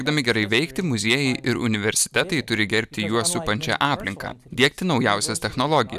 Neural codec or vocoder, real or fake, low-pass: none; real; 14.4 kHz